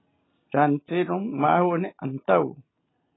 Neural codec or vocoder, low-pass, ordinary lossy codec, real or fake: none; 7.2 kHz; AAC, 16 kbps; real